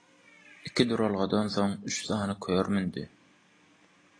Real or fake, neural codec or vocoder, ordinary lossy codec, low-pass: real; none; AAC, 32 kbps; 9.9 kHz